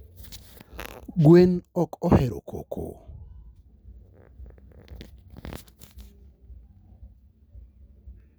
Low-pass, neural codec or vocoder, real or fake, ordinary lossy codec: none; none; real; none